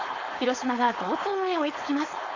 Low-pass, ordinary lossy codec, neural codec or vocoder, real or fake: 7.2 kHz; AAC, 48 kbps; codec, 16 kHz, 4.8 kbps, FACodec; fake